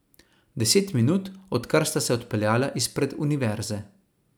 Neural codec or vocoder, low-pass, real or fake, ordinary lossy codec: none; none; real; none